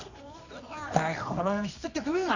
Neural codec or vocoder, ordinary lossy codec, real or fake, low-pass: codec, 24 kHz, 0.9 kbps, WavTokenizer, medium music audio release; none; fake; 7.2 kHz